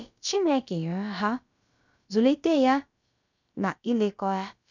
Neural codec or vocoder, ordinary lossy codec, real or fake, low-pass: codec, 16 kHz, about 1 kbps, DyCAST, with the encoder's durations; none; fake; 7.2 kHz